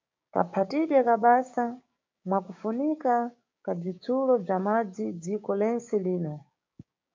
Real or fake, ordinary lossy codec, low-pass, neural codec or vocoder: fake; MP3, 48 kbps; 7.2 kHz; codec, 16 kHz, 6 kbps, DAC